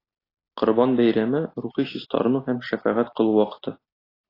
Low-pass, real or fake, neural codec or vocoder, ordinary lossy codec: 5.4 kHz; real; none; AAC, 24 kbps